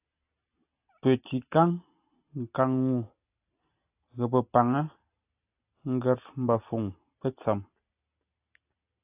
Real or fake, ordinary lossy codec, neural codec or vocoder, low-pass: real; AAC, 32 kbps; none; 3.6 kHz